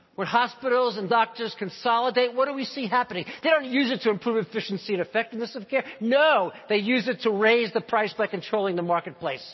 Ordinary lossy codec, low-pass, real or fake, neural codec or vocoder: MP3, 24 kbps; 7.2 kHz; real; none